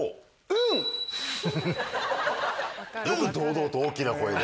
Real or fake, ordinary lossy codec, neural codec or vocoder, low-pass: real; none; none; none